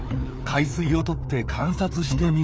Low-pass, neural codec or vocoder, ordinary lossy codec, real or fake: none; codec, 16 kHz, 4 kbps, FreqCodec, larger model; none; fake